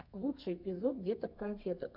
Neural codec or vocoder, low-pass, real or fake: codec, 32 kHz, 1.9 kbps, SNAC; 5.4 kHz; fake